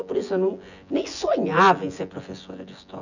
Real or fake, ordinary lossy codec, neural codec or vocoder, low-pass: fake; none; vocoder, 24 kHz, 100 mel bands, Vocos; 7.2 kHz